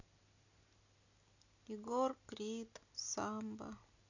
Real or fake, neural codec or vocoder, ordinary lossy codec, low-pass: real; none; none; 7.2 kHz